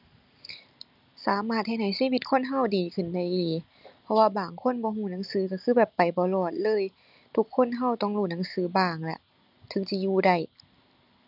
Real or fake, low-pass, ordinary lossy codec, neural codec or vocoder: real; 5.4 kHz; none; none